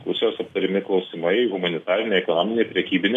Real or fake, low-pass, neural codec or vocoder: real; 14.4 kHz; none